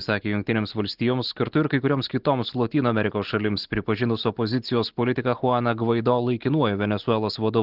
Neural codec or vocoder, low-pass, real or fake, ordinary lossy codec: none; 5.4 kHz; real; Opus, 16 kbps